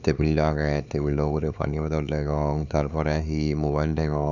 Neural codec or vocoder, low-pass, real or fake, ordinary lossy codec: codec, 16 kHz, 4 kbps, X-Codec, HuBERT features, trained on LibriSpeech; 7.2 kHz; fake; none